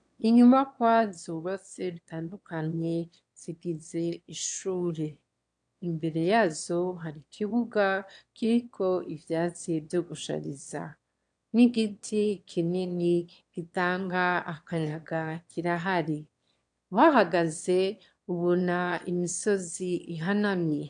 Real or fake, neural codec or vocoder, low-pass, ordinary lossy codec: fake; autoencoder, 22.05 kHz, a latent of 192 numbers a frame, VITS, trained on one speaker; 9.9 kHz; AAC, 64 kbps